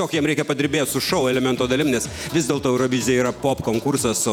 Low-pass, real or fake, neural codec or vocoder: 19.8 kHz; fake; vocoder, 48 kHz, 128 mel bands, Vocos